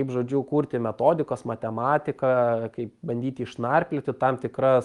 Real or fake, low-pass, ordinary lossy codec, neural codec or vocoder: real; 10.8 kHz; Opus, 32 kbps; none